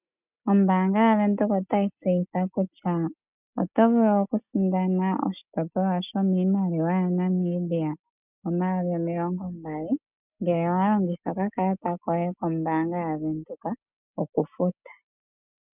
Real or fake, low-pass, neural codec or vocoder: real; 3.6 kHz; none